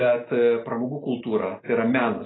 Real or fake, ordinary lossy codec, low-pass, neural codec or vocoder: real; AAC, 16 kbps; 7.2 kHz; none